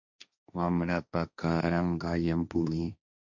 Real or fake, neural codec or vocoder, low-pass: fake; codec, 16 kHz, 1.1 kbps, Voila-Tokenizer; 7.2 kHz